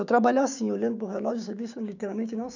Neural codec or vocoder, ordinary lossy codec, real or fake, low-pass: codec, 16 kHz, 6 kbps, DAC; none; fake; 7.2 kHz